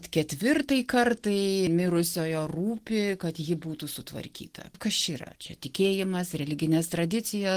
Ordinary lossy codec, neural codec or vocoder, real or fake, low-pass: Opus, 16 kbps; none; real; 14.4 kHz